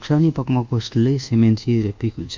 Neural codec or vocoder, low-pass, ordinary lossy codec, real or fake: codec, 24 kHz, 1.2 kbps, DualCodec; 7.2 kHz; MP3, 64 kbps; fake